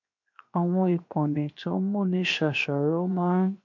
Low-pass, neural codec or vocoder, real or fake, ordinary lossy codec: 7.2 kHz; codec, 16 kHz, 0.7 kbps, FocalCodec; fake; MP3, 48 kbps